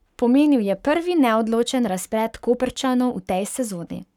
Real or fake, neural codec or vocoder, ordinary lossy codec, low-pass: fake; codec, 44.1 kHz, 7.8 kbps, Pupu-Codec; none; 19.8 kHz